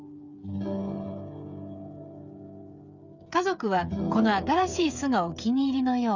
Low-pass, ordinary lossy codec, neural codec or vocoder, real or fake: 7.2 kHz; none; codec, 16 kHz, 8 kbps, FreqCodec, smaller model; fake